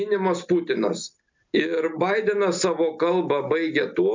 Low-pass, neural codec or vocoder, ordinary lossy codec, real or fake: 7.2 kHz; none; MP3, 64 kbps; real